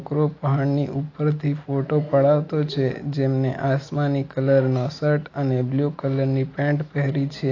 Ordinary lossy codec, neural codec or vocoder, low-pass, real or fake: AAC, 32 kbps; none; 7.2 kHz; real